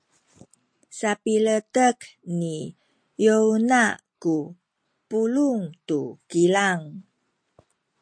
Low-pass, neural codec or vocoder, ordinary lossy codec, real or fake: 9.9 kHz; none; MP3, 48 kbps; real